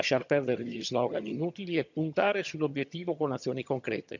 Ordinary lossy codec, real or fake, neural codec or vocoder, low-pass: none; fake; vocoder, 22.05 kHz, 80 mel bands, HiFi-GAN; 7.2 kHz